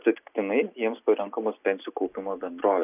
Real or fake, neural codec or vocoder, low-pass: real; none; 3.6 kHz